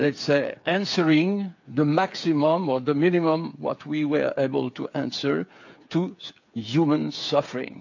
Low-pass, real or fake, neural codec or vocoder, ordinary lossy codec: 7.2 kHz; fake; codec, 16 kHz, 8 kbps, FreqCodec, smaller model; AAC, 48 kbps